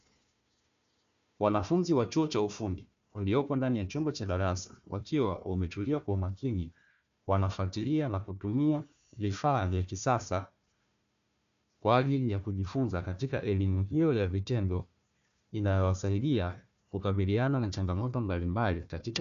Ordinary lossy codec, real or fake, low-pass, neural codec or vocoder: MP3, 64 kbps; fake; 7.2 kHz; codec, 16 kHz, 1 kbps, FunCodec, trained on Chinese and English, 50 frames a second